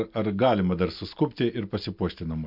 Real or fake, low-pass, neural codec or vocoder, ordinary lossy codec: real; 5.4 kHz; none; MP3, 48 kbps